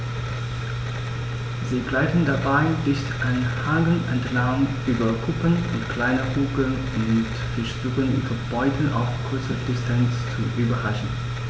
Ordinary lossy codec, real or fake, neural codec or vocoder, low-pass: none; real; none; none